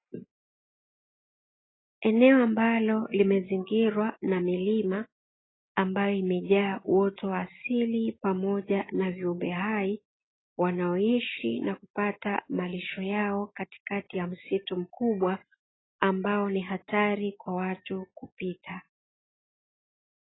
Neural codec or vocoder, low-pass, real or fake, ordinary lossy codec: none; 7.2 kHz; real; AAC, 16 kbps